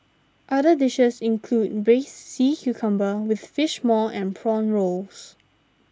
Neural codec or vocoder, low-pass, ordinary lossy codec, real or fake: none; none; none; real